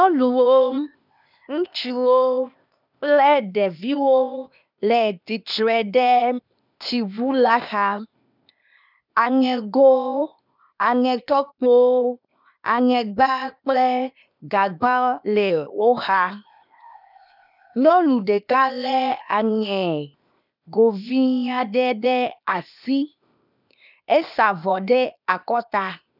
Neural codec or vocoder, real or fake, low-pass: codec, 16 kHz, 0.8 kbps, ZipCodec; fake; 5.4 kHz